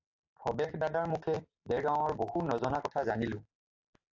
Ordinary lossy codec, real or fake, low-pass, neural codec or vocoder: AAC, 48 kbps; real; 7.2 kHz; none